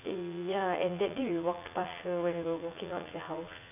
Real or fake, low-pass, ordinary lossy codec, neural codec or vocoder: fake; 3.6 kHz; none; vocoder, 44.1 kHz, 80 mel bands, Vocos